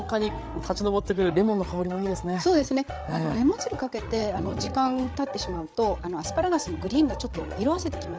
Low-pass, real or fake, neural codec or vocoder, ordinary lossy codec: none; fake; codec, 16 kHz, 8 kbps, FreqCodec, larger model; none